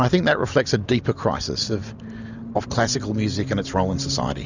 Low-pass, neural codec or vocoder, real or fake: 7.2 kHz; none; real